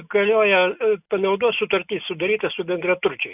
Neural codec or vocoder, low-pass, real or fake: none; 3.6 kHz; real